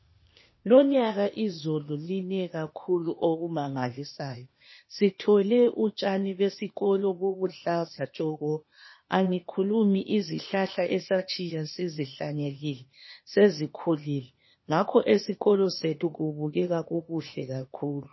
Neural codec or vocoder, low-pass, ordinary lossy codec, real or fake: codec, 16 kHz, 0.8 kbps, ZipCodec; 7.2 kHz; MP3, 24 kbps; fake